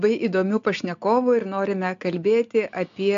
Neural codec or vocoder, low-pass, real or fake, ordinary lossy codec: none; 7.2 kHz; real; AAC, 64 kbps